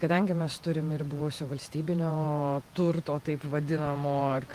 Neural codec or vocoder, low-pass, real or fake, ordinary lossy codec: vocoder, 48 kHz, 128 mel bands, Vocos; 14.4 kHz; fake; Opus, 32 kbps